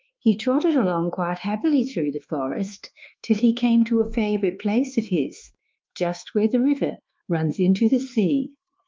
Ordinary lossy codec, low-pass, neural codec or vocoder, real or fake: Opus, 32 kbps; 7.2 kHz; codec, 24 kHz, 1.2 kbps, DualCodec; fake